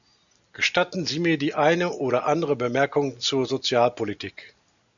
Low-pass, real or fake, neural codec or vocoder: 7.2 kHz; real; none